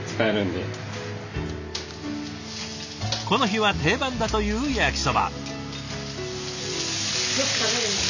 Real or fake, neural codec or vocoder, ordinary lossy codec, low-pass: real; none; none; 7.2 kHz